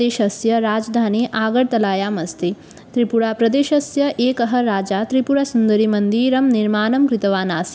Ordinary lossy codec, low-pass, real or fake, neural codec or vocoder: none; none; real; none